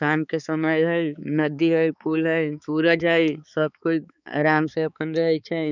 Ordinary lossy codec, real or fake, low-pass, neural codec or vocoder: none; fake; 7.2 kHz; codec, 16 kHz, 4 kbps, X-Codec, HuBERT features, trained on balanced general audio